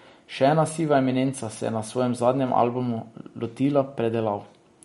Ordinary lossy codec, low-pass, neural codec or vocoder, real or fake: MP3, 48 kbps; 19.8 kHz; none; real